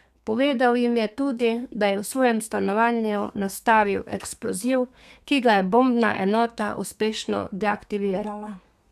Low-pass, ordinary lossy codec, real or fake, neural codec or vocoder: 14.4 kHz; none; fake; codec, 32 kHz, 1.9 kbps, SNAC